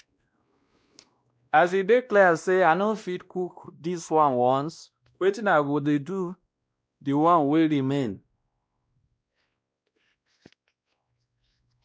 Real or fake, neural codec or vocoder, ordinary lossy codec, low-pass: fake; codec, 16 kHz, 1 kbps, X-Codec, WavLM features, trained on Multilingual LibriSpeech; none; none